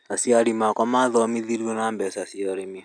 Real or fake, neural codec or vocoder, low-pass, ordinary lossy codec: real; none; 9.9 kHz; none